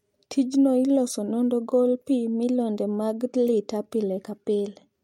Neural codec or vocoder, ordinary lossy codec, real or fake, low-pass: none; MP3, 64 kbps; real; 19.8 kHz